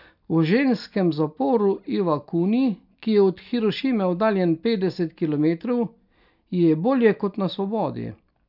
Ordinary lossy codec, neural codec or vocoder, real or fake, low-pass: none; none; real; 5.4 kHz